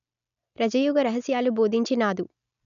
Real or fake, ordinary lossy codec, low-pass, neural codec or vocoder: real; none; 7.2 kHz; none